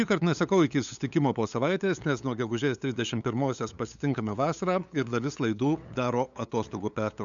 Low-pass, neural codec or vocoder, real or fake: 7.2 kHz; codec, 16 kHz, 8 kbps, FunCodec, trained on LibriTTS, 25 frames a second; fake